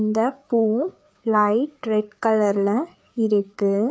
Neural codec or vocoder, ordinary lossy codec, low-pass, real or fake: codec, 16 kHz, 4 kbps, FreqCodec, larger model; none; none; fake